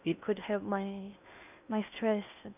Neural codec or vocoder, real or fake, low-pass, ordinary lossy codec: codec, 16 kHz in and 24 kHz out, 0.6 kbps, FocalCodec, streaming, 2048 codes; fake; 3.6 kHz; none